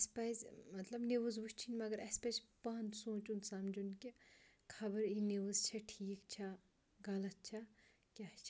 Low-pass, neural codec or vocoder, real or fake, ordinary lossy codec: none; none; real; none